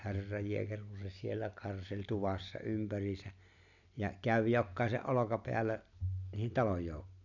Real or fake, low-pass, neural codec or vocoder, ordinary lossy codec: real; 7.2 kHz; none; none